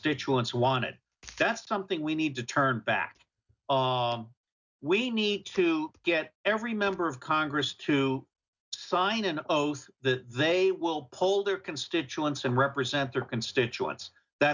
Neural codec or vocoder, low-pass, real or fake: none; 7.2 kHz; real